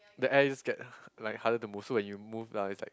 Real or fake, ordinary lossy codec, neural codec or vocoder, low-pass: real; none; none; none